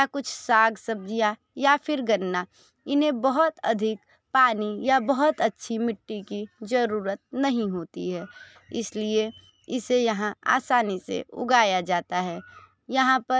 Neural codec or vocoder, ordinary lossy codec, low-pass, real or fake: none; none; none; real